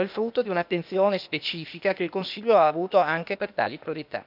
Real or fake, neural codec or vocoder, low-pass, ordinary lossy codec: fake; codec, 16 kHz, 0.8 kbps, ZipCodec; 5.4 kHz; none